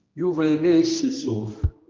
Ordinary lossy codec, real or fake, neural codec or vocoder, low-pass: Opus, 24 kbps; fake; codec, 16 kHz, 1 kbps, X-Codec, HuBERT features, trained on general audio; 7.2 kHz